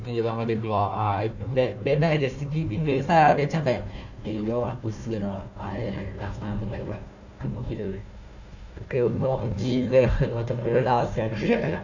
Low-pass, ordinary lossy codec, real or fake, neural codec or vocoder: 7.2 kHz; none; fake; codec, 16 kHz, 1 kbps, FunCodec, trained on Chinese and English, 50 frames a second